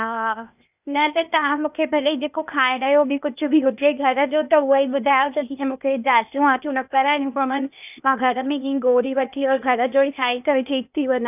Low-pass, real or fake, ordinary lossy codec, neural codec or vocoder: 3.6 kHz; fake; none; codec, 16 kHz, 0.8 kbps, ZipCodec